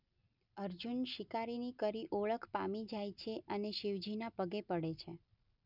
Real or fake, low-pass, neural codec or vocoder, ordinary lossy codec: real; 5.4 kHz; none; none